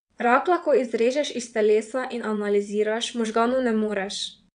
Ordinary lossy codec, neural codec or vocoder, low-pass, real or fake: none; vocoder, 22.05 kHz, 80 mel bands, WaveNeXt; 9.9 kHz; fake